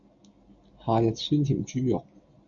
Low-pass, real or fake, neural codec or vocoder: 7.2 kHz; real; none